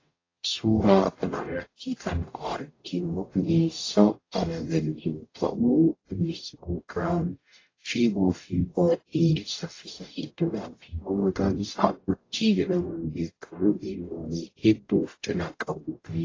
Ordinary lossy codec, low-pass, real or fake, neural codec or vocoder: AAC, 32 kbps; 7.2 kHz; fake; codec, 44.1 kHz, 0.9 kbps, DAC